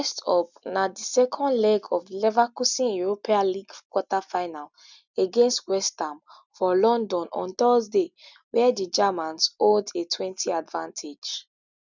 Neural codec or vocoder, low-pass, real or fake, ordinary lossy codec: none; 7.2 kHz; real; none